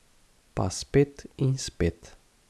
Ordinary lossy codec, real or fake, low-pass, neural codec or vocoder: none; real; none; none